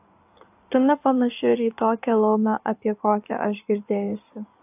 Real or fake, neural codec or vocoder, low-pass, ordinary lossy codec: fake; codec, 16 kHz in and 24 kHz out, 2.2 kbps, FireRedTTS-2 codec; 3.6 kHz; AAC, 32 kbps